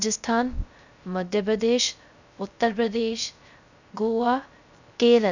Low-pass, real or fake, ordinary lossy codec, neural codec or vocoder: 7.2 kHz; fake; none; codec, 16 kHz, 0.3 kbps, FocalCodec